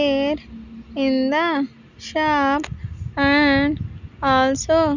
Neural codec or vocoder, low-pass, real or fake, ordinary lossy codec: none; 7.2 kHz; real; none